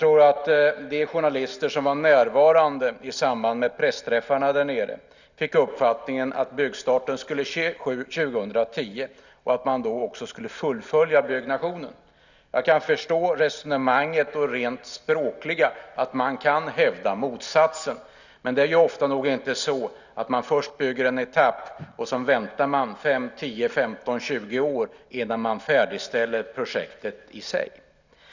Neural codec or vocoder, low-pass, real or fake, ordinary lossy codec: none; 7.2 kHz; real; none